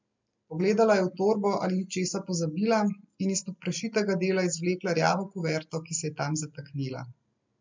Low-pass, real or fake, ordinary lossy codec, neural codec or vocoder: 7.2 kHz; real; MP3, 64 kbps; none